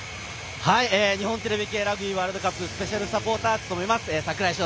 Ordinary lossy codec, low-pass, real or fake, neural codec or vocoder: none; none; real; none